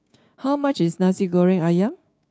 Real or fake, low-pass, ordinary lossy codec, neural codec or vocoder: fake; none; none; codec, 16 kHz, 6 kbps, DAC